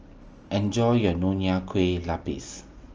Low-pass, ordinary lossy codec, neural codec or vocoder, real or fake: 7.2 kHz; Opus, 24 kbps; none; real